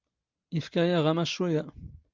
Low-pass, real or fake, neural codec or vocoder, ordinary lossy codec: 7.2 kHz; real; none; Opus, 24 kbps